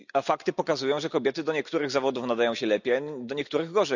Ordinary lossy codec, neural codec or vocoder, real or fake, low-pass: none; none; real; 7.2 kHz